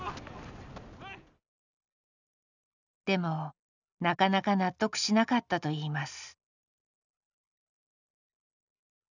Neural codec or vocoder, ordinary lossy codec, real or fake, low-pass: none; none; real; 7.2 kHz